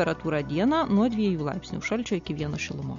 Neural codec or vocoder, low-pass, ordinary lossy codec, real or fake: none; 7.2 kHz; MP3, 48 kbps; real